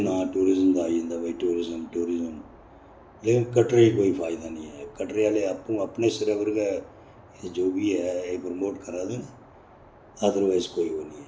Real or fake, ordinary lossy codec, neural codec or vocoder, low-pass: real; none; none; none